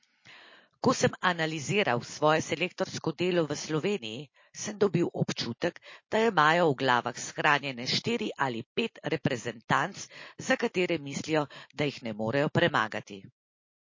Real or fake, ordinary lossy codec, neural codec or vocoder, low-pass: real; MP3, 32 kbps; none; 7.2 kHz